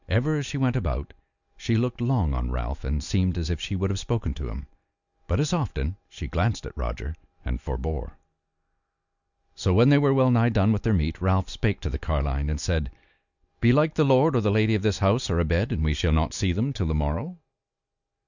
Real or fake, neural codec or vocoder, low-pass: real; none; 7.2 kHz